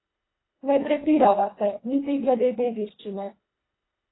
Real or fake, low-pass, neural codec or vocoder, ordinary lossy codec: fake; 7.2 kHz; codec, 24 kHz, 1.5 kbps, HILCodec; AAC, 16 kbps